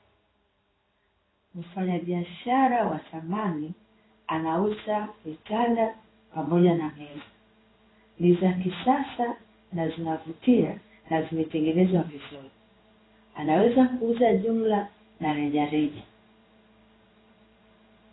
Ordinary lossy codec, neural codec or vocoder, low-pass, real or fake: AAC, 16 kbps; codec, 16 kHz in and 24 kHz out, 1 kbps, XY-Tokenizer; 7.2 kHz; fake